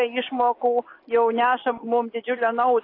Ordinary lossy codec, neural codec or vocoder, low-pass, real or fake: AAC, 32 kbps; none; 5.4 kHz; real